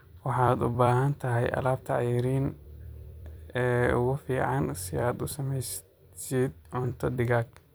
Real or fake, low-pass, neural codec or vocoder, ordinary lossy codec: fake; none; vocoder, 44.1 kHz, 128 mel bands every 256 samples, BigVGAN v2; none